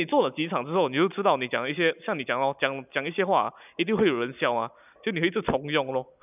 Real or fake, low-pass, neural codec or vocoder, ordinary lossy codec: real; 3.6 kHz; none; none